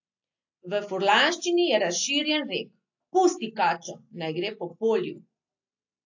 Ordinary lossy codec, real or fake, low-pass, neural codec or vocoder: AAC, 48 kbps; real; 7.2 kHz; none